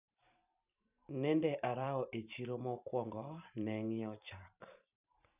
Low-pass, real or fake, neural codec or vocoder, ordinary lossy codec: 3.6 kHz; fake; vocoder, 44.1 kHz, 128 mel bands every 512 samples, BigVGAN v2; none